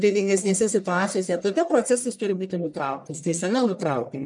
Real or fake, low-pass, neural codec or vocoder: fake; 10.8 kHz; codec, 44.1 kHz, 1.7 kbps, Pupu-Codec